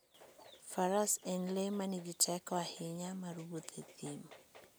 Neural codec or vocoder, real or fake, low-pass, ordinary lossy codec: none; real; none; none